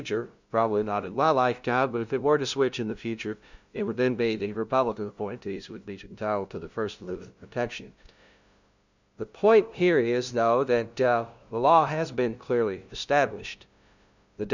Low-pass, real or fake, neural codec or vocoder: 7.2 kHz; fake; codec, 16 kHz, 0.5 kbps, FunCodec, trained on LibriTTS, 25 frames a second